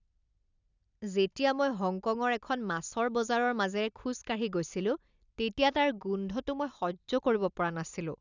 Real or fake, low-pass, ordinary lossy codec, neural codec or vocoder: real; 7.2 kHz; none; none